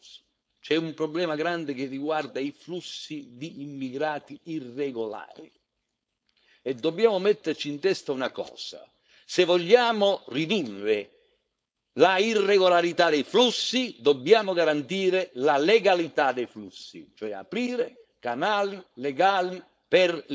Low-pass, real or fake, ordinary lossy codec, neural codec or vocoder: none; fake; none; codec, 16 kHz, 4.8 kbps, FACodec